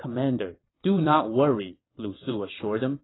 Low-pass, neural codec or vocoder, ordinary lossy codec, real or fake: 7.2 kHz; vocoder, 22.05 kHz, 80 mel bands, WaveNeXt; AAC, 16 kbps; fake